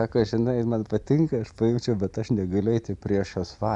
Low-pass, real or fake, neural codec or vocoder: 10.8 kHz; real; none